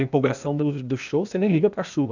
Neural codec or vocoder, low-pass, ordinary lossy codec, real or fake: codec, 16 kHz, 0.8 kbps, ZipCodec; 7.2 kHz; none; fake